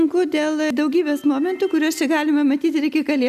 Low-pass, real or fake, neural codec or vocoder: 14.4 kHz; real; none